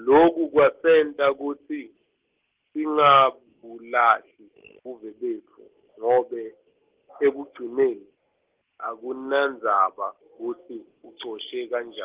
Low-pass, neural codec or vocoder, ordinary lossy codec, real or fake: 3.6 kHz; none; Opus, 16 kbps; real